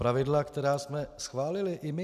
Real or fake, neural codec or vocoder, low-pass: real; none; 14.4 kHz